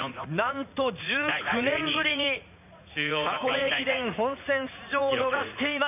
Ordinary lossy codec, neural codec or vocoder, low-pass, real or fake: none; vocoder, 44.1 kHz, 80 mel bands, Vocos; 3.6 kHz; fake